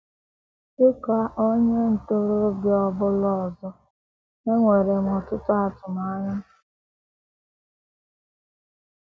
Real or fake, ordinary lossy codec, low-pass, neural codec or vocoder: real; none; none; none